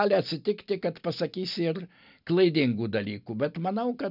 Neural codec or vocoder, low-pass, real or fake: none; 5.4 kHz; real